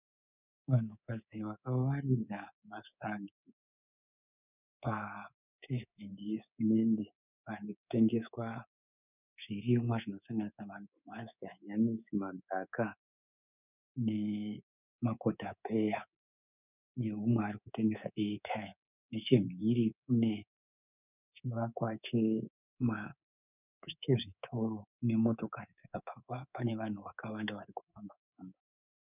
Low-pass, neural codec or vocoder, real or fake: 3.6 kHz; codec, 24 kHz, 3.1 kbps, DualCodec; fake